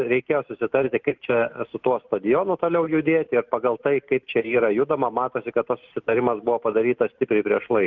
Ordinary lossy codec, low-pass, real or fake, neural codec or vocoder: Opus, 32 kbps; 7.2 kHz; real; none